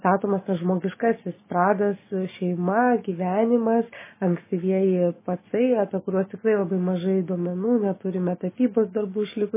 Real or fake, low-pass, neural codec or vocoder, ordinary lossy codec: real; 3.6 kHz; none; MP3, 16 kbps